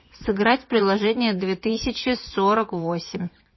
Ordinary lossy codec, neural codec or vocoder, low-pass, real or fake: MP3, 24 kbps; vocoder, 24 kHz, 100 mel bands, Vocos; 7.2 kHz; fake